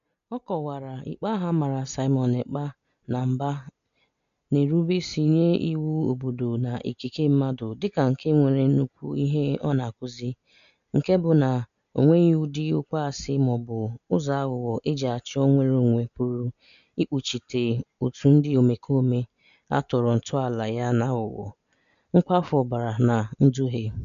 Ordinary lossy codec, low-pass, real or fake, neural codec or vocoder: none; 7.2 kHz; real; none